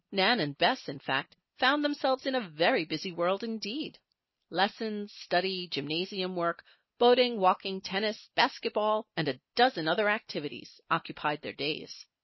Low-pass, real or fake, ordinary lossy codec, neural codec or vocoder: 7.2 kHz; real; MP3, 24 kbps; none